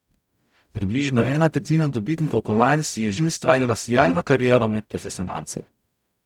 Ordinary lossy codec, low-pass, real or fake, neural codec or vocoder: none; 19.8 kHz; fake; codec, 44.1 kHz, 0.9 kbps, DAC